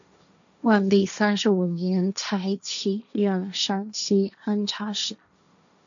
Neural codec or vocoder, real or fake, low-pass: codec, 16 kHz, 1.1 kbps, Voila-Tokenizer; fake; 7.2 kHz